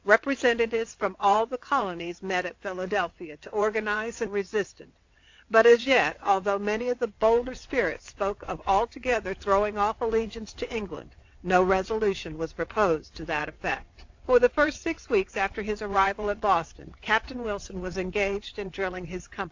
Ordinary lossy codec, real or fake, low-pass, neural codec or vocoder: AAC, 48 kbps; fake; 7.2 kHz; vocoder, 22.05 kHz, 80 mel bands, WaveNeXt